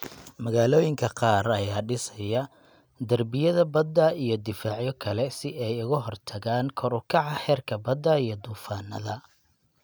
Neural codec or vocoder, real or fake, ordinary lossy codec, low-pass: none; real; none; none